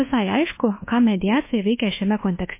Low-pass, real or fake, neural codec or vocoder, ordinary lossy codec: 3.6 kHz; fake; codec, 24 kHz, 1.2 kbps, DualCodec; MP3, 24 kbps